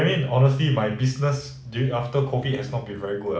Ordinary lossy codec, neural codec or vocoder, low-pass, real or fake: none; none; none; real